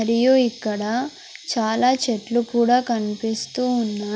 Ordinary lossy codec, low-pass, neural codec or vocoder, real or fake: none; none; none; real